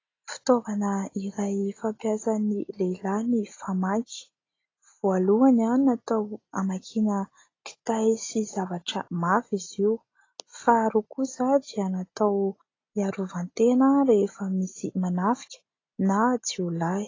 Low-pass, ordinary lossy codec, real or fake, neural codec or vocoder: 7.2 kHz; AAC, 32 kbps; real; none